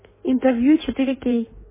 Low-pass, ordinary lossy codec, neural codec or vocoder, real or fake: 3.6 kHz; MP3, 16 kbps; codec, 16 kHz in and 24 kHz out, 1.1 kbps, FireRedTTS-2 codec; fake